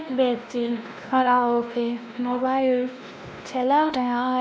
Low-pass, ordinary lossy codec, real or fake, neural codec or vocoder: none; none; fake; codec, 16 kHz, 1 kbps, X-Codec, WavLM features, trained on Multilingual LibriSpeech